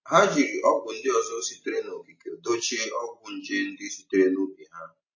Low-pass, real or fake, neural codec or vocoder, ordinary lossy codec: 7.2 kHz; real; none; MP3, 32 kbps